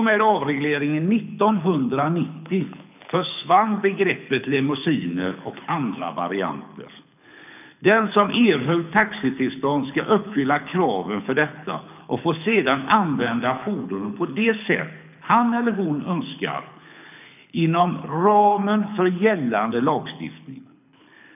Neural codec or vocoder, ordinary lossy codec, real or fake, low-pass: codec, 24 kHz, 6 kbps, HILCodec; none; fake; 3.6 kHz